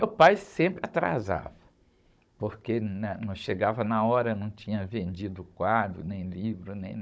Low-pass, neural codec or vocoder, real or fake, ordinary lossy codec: none; codec, 16 kHz, 16 kbps, FunCodec, trained on Chinese and English, 50 frames a second; fake; none